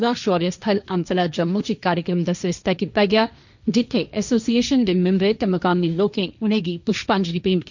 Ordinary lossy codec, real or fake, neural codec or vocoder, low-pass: none; fake; codec, 16 kHz, 1.1 kbps, Voila-Tokenizer; 7.2 kHz